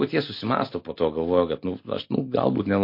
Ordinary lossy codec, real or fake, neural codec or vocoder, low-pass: MP3, 32 kbps; real; none; 5.4 kHz